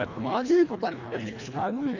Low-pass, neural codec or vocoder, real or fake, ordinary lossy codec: 7.2 kHz; codec, 24 kHz, 1.5 kbps, HILCodec; fake; none